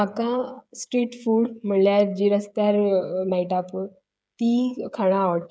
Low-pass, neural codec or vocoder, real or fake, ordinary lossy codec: none; codec, 16 kHz, 16 kbps, FreqCodec, smaller model; fake; none